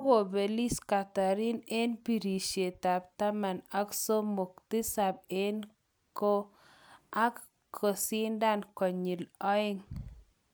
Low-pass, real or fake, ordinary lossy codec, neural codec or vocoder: none; real; none; none